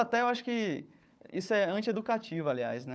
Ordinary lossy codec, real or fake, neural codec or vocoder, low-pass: none; fake; codec, 16 kHz, 4 kbps, FunCodec, trained on Chinese and English, 50 frames a second; none